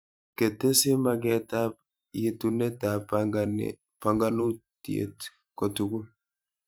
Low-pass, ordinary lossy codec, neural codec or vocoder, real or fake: none; none; vocoder, 44.1 kHz, 128 mel bands every 512 samples, BigVGAN v2; fake